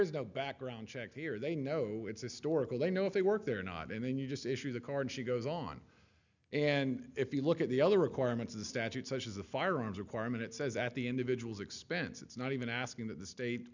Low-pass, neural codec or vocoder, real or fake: 7.2 kHz; none; real